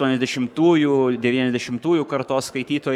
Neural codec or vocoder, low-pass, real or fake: codec, 44.1 kHz, 7.8 kbps, Pupu-Codec; 19.8 kHz; fake